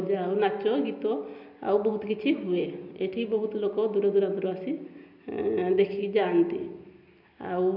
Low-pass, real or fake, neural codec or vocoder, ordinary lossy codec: 5.4 kHz; real; none; none